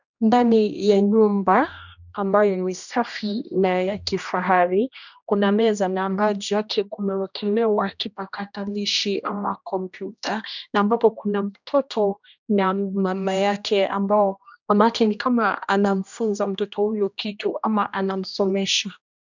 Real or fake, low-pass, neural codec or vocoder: fake; 7.2 kHz; codec, 16 kHz, 1 kbps, X-Codec, HuBERT features, trained on general audio